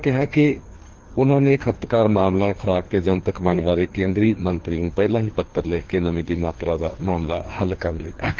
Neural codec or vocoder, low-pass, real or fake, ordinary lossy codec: codec, 16 kHz, 2 kbps, FreqCodec, larger model; 7.2 kHz; fake; Opus, 16 kbps